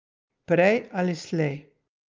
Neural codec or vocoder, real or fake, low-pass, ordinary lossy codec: none; real; 7.2 kHz; Opus, 24 kbps